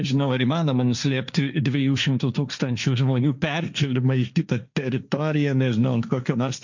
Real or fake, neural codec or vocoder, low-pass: fake; codec, 16 kHz, 1.1 kbps, Voila-Tokenizer; 7.2 kHz